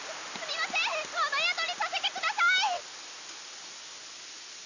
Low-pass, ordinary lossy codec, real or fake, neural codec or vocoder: 7.2 kHz; none; real; none